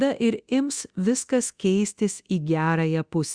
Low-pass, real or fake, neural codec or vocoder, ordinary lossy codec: 9.9 kHz; fake; codec, 24 kHz, 0.9 kbps, DualCodec; Opus, 64 kbps